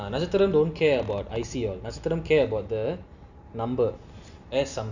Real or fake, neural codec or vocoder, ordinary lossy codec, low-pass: real; none; none; 7.2 kHz